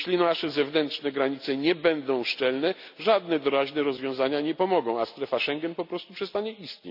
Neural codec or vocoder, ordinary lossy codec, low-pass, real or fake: none; none; 5.4 kHz; real